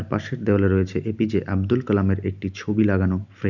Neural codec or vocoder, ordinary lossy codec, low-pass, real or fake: none; none; 7.2 kHz; real